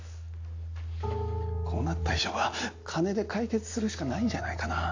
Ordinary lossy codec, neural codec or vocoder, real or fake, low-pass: AAC, 48 kbps; none; real; 7.2 kHz